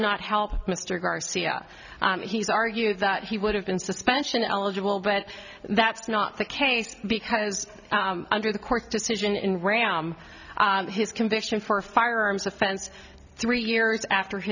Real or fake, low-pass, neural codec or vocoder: real; 7.2 kHz; none